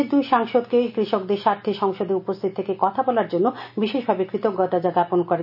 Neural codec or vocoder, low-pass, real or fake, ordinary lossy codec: none; 5.4 kHz; real; none